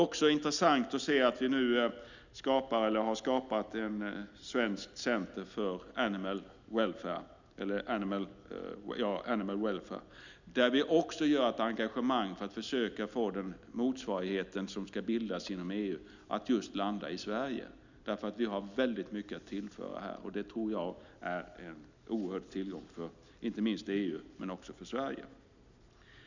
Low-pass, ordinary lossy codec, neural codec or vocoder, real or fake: 7.2 kHz; none; none; real